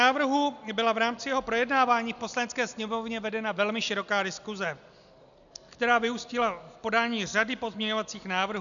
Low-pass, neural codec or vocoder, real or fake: 7.2 kHz; none; real